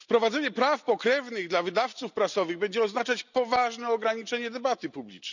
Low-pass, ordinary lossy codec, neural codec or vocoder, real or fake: 7.2 kHz; none; none; real